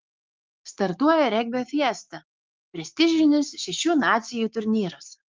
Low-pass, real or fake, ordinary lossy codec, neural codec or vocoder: 7.2 kHz; fake; Opus, 32 kbps; codec, 16 kHz, 6 kbps, DAC